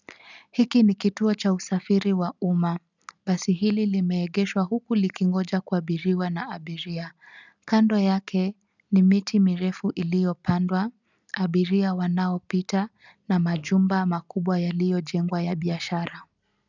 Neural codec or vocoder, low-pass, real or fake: none; 7.2 kHz; real